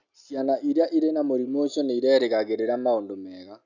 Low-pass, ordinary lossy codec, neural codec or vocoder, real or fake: 7.2 kHz; none; none; real